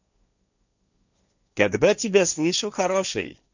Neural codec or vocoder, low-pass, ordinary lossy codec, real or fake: codec, 16 kHz, 1.1 kbps, Voila-Tokenizer; 7.2 kHz; none; fake